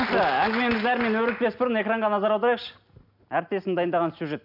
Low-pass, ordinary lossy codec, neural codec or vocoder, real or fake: 5.4 kHz; none; none; real